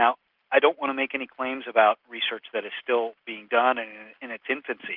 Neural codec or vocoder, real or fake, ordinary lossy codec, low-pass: none; real; Opus, 24 kbps; 5.4 kHz